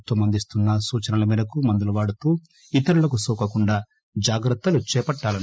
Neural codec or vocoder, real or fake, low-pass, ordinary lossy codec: none; real; none; none